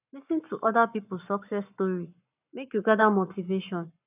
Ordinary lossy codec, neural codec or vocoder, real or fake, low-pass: none; codec, 44.1 kHz, 7.8 kbps, Pupu-Codec; fake; 3.6 kHz